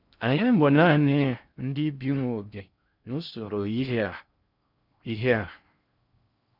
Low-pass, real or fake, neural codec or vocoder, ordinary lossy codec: 5.4 kHz; fake; codec, 16 kHz in and 24 kHz out, 0.6 kbps, FocalCodec, streaming, 4096 codes; none